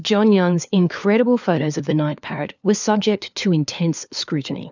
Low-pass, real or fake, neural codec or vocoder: 7.2 kHz; fake; codec, 16 kHz, 4 kbps, FunCodec, trained on LibriTTS, 50 frames a second